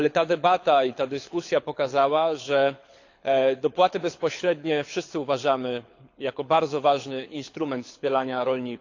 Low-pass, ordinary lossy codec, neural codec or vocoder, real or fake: 7.2 kHz; AAC, 48 kbps; codec, 24 kHz, 6 kbps, HILCodec; fake